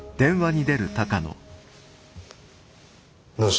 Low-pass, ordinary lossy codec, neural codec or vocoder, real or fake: none; none; none; real